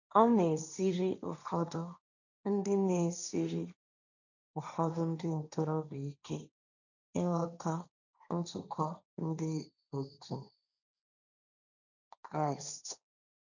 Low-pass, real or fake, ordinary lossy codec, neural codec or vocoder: 7.2 kHz; fake; none; codec, 16 kHz, 1.1 kbps, Voila-Tokenizer